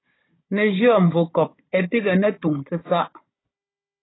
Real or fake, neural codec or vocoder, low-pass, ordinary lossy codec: fake; codec, 16 kHz, 16 kbps, FunCodec, trained on Chinese and English, 50 frames a second; 7.2 kHz; AAC, 16 kbps